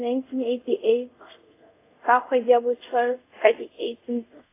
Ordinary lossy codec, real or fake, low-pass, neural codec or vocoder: AAC, 24 kbps; fake; 3.6 kHz; codec, 24 kHz, 0.5 kbps, DualCodec